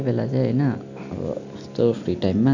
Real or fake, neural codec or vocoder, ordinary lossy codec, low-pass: real; none; none; 7.2 kHz